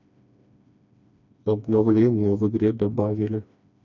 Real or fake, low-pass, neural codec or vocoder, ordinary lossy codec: fake; 7.2 kHz; codec, 16 kHz, 2 kbps, FreqCodec, smaller model; none